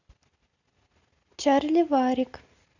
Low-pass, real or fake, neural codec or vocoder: 7.2 kHz; real; none